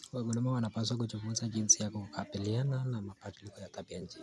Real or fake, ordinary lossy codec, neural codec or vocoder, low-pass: real; none; none; none